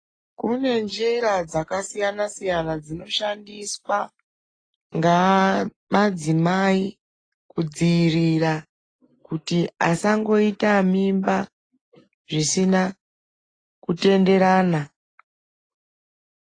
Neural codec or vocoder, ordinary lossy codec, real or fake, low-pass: none; AAC, 32 kbps; real; 9.9 kHz